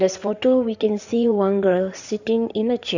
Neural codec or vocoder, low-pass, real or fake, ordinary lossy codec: codec, 16 kHz in and 24 kHz out, 2.2 kbps, FireRedTTS-2 codec; 7.2 kHz; fake; none